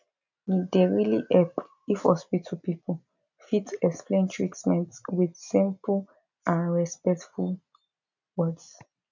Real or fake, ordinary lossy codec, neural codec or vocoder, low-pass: real; none; none; 7.2 kHz